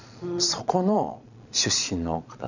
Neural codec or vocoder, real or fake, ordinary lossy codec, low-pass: vocoder, 22.05 kHz, 80 mel bands, Vocos; fake; none; 7.2 kHz